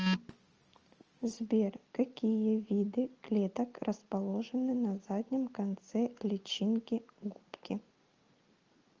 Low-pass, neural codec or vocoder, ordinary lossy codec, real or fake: 7.2 kHz; none; Opus, 24 kbps; real